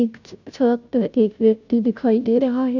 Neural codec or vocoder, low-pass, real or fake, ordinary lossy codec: codec, 16 kHz, 0.5 kbps, FunCodec, trained on Chinese and English, 25 frames a second; 7.2 kHz; fake; none